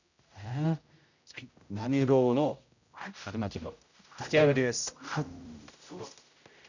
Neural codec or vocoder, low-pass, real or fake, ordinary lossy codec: codec, 16 kHz, 0.5 kbps, X-Codec, HuBERT features, trained on general audio; 7.2 kHz; fake; none